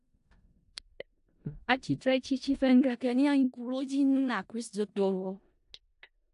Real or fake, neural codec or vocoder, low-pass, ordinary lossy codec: fake; codec, 16 kHz in and 24 kHz out, 0.4 kbps, LongCat-Audio-Codec, four codebook decoder; 10.8 kHz; AAC, 64 kbps